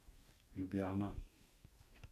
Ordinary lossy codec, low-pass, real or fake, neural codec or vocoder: AAC, 64 kbps; 14.4 kHz; fake; codec, 44.1 kHz, 2.6 kbps, DAC